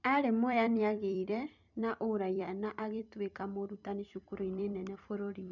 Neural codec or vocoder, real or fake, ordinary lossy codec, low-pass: vocoder, 44.1 kHz, 128 mel bands every 256 samples, BigVGAN v2; fake; none; 7.2 kHz